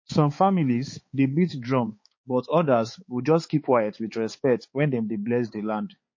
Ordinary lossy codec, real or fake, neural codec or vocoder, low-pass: MP3, 32 kbps; fake; codec, 16 kHz, 4 kbps, X-Codec, HuBERT features, trained on balanced general audio; 7.2 kHz